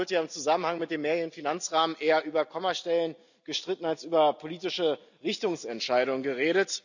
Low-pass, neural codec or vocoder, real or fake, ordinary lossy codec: 7.2 kHz; none; real; none